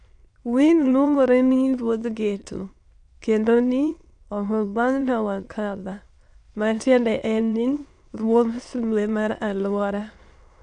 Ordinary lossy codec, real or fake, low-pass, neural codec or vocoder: AAC, 64 kbps; fake; 9.9 kHz; autoencoder, 22.05 kHz, a latent of 192 numbers a frame, VITS, trained on many speakers